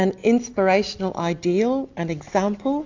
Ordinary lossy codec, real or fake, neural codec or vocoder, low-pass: AAC, 48 kbps; real; none; 7.2 kHz